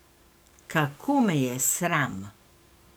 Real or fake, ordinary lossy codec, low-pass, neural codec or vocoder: fake; none; none; codec, 44.1 kHz, 7.8 kbps, DAC